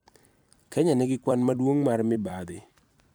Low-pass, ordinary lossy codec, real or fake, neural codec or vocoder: none; none; fake; vocoder, 44.1 kHz, 128 mel bands every 256 samples, BigVGAN v2